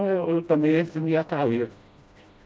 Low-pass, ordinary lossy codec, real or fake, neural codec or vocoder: none; none; fake; codec, 16 kHz, 1 kbps, FreqCodec, smaller model